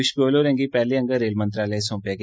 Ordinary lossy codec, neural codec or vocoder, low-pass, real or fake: none; none; none; real